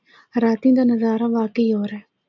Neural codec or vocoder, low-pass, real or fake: none; 7.2 kHz; real